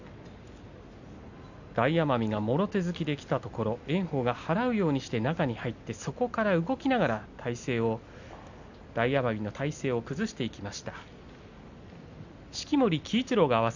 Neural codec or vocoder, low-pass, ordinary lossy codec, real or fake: none; 7.2 kHz; none; real